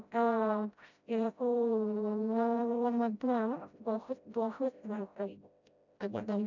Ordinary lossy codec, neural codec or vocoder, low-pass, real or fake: none; codec, 16 kHz, 0.5 kbps, FreqCodec, smaller model; 7.2 kHz; fake